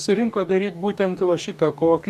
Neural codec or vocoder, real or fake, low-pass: codec, 44.1 kHz, 2.6 kbps, DAC; fake; 14.4 kHz